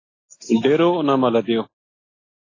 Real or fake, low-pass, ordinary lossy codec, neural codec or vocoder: real; 7.2 kHz; AAC, 32 kbps; none